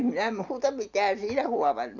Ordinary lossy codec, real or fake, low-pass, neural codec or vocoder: none; real; 7.2 kHz; none